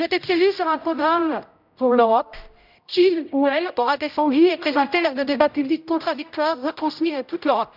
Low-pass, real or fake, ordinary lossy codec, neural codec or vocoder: 5.4 kHz; fake; none; codec, 16 kHz, 0.5 kbps, X-Codec, HuBERT features, trained on general audio